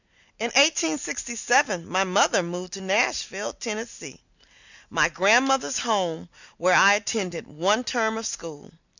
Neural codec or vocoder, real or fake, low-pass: none; real; 7.2 kHz